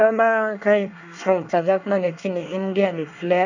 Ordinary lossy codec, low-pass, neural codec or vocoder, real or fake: none; 7.2 kHz; codec, 24 kHz, 1 kbps, SNAC; fake